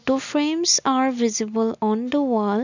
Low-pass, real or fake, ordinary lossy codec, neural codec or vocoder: 7.2 kHz; real; none; none